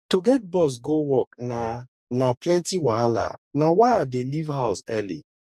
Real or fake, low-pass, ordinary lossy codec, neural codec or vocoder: fake; 14.4 kHz; none; codec, 44.1 kHz, 2.6 kbps, DAC